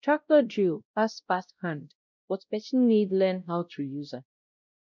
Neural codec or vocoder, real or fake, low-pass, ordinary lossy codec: codec, 16 kHz, 0.5 kbps, X-Codec, WavLM features, trained on Multilingual LibriSpeech; fake; 7.2 kHz; none